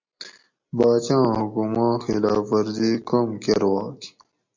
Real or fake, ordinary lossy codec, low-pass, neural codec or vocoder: real; MP3, 48 kbps; 7.2 kHz; none